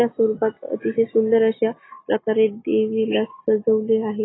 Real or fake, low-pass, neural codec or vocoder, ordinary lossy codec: real; 7.2 kHz; none; AAC, 16 kbps